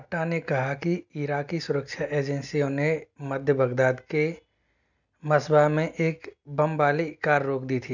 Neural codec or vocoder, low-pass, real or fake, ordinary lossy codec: none; 7.2 kHz; real; none